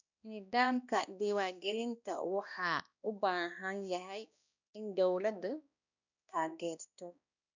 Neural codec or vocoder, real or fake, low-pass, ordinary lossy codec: codec, 16 kHz, 1 kbps, X-Codec, HuBERT features, trained on balanced general audio; fake; 7.2 kHz; none